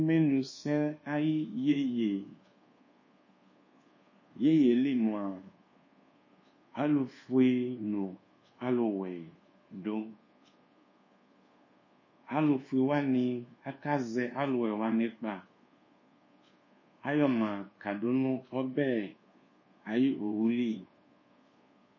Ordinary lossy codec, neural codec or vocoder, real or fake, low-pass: MP3, 32 kbps; codec, 24 kHz, 1.2 kbps, DualCodec; fake; 7.2 kHz